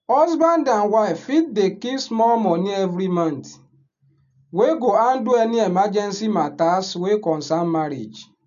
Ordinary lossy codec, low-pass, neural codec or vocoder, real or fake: none; 7.2 kHz; none; real